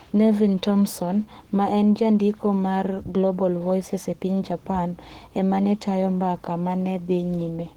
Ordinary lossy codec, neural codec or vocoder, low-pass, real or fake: Opus, 16 kbps; codec, 44.1 kHz, 7.8 kbps, Pupu-Codec; 19.8 kHz; fake